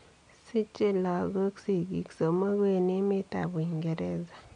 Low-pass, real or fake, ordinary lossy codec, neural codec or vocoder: 9.9 kHz; real; none; none